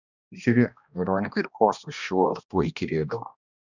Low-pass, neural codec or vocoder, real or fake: 7.2 kHz; codec, 16 kHz, 1 kbps, X-Codec, HuBERT features, trained on balanced general audio; fake